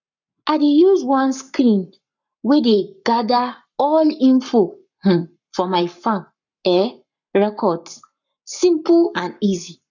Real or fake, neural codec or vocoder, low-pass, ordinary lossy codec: fake; codec, 44.1 kHz, 7.8 kbps, Pupu-Codec; 7.2 kHz; none